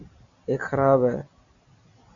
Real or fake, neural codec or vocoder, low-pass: real; none; 7.2 kHz